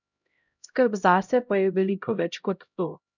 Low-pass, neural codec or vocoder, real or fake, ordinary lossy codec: 7.2 kHz; codec, 16 kHz, 0.5 kbps, X-Codec, HuBERT features, trained on LibriSpeech; fake; none